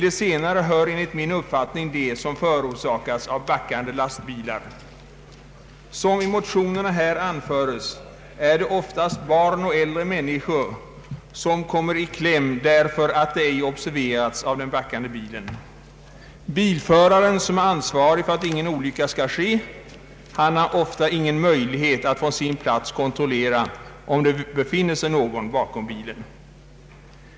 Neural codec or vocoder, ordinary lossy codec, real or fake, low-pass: none; none; real; none